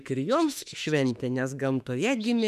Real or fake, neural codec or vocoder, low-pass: fake; autoencoder, 48 kHz, 32 numbers a frame, DAC-VAE, trained on Japanese speech; 14.4 kHz